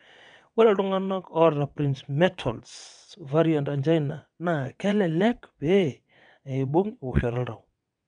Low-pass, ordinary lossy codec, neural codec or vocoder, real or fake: 9.9 kHz; none; vocoder, 22.05 kHz, 80 mel bands, WaveNeXt; fake